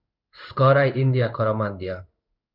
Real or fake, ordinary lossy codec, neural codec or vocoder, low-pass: fake; Opus, 64 kbps; codec, 16 kHz in and 24 kHz out, 1 kbps, XY-Tokenizer; 5.4 kHz